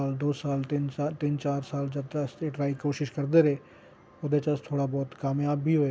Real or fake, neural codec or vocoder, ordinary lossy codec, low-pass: real; none; none; none